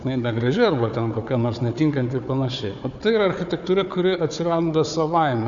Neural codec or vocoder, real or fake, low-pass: codec, 16 kHz, 4 kbps, FunCodec, trained on Chinese and English, 50 frames a second; fake; 7.2 kHz